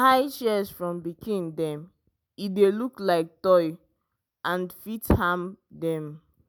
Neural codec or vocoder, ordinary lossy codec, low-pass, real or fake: none; none; none; real